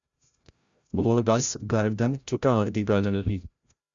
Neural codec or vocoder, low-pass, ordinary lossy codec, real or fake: codec, 16 kHz, 0.5 kbps, FreqCodec, larger model; 7.2 kHz; Opus, 64 kbps; fake